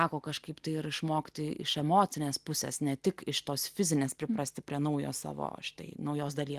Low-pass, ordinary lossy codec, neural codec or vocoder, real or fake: 14.4 kHz; Opus, 24 kbps; none; real